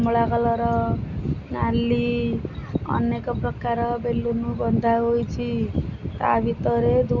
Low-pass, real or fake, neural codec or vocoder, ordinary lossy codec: 7.2 kHz; real; none; none